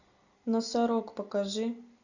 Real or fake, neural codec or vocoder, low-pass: real; none; 7.2 kHz